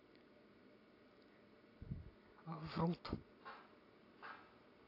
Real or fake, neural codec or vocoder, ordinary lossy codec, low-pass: real; none; AAC, 24 kbps; 5.4 kHz